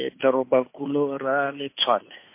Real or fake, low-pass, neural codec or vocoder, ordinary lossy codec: fake; 3.6 kHz; codec, 16 kHz, 2 kbps, FunCodec, trained on Chinese and English, 25 frames a second; MP3, 24 kbps